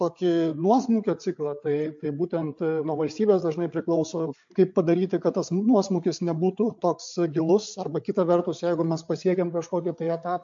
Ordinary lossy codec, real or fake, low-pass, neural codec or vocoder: MP3, 48 kbps; fake; 7.2 kHz; codec, 16 kHz, 8 kbps, FreqCodec, larger model